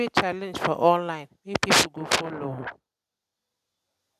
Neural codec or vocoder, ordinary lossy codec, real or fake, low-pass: none; none; real; 14.4 kHz